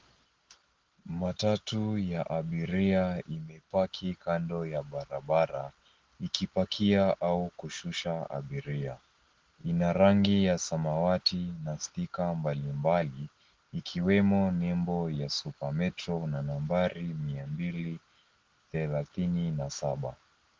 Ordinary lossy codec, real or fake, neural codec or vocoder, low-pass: Opus, 16 kbps; real; none; 7.2 kHz